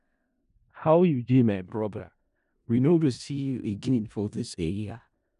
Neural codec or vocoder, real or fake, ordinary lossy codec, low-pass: codec, 16 kHz in and 24 kHz out, 0.4 kbps, LongCat-Audio-Codec, four codebook decoder; fake; none; 10.8 kHz